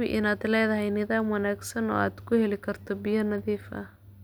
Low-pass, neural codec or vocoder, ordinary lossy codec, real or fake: none; none; none; real